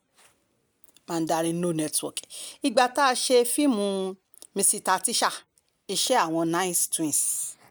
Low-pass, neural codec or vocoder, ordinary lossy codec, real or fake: none; none; none; real